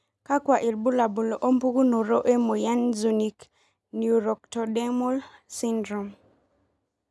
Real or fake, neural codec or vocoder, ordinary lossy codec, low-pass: real; none; none; none